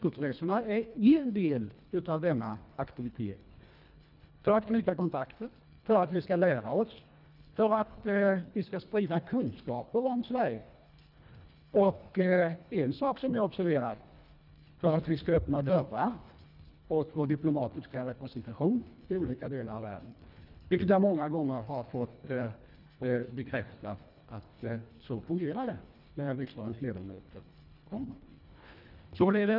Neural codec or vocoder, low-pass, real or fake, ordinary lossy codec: codec, 24 kHz, 1.5 kbps, HILCodec; 5.4 kHz; fake; none